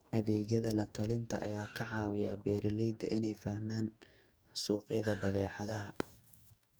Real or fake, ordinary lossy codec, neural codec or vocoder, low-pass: fake; none; codec, 44.1 kHz, 2.6 kbps, DAC; none